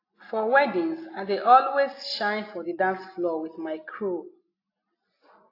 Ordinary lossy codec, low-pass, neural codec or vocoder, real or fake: AAC, 32 kbps; 5.4 kHz; none; real